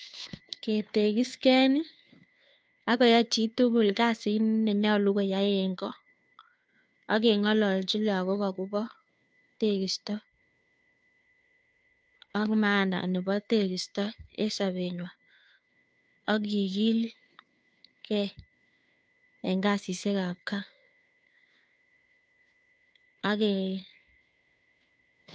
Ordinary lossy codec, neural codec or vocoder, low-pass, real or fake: none; codec, 16 kHz, 2 kbps, FunCodec, trained on Chinese and English, 25 frames a second; none; fake